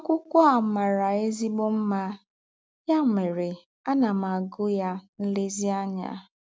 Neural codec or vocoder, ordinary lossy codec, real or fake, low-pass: none; none; real; none